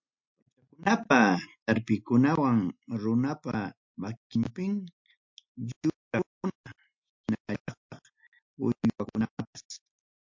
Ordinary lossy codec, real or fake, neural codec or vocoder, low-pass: MP3, 48 kbps; real; none; 7.2 kHz